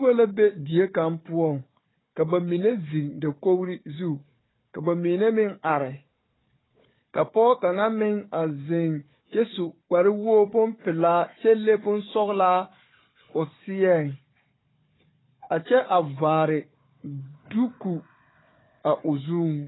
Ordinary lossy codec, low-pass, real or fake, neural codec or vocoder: AAC, 16 kbps; 7.2 kHz; fake; codec, 16 kHz, 4 kbps, FunCodec, trained on Chinese and English, 50 frames a second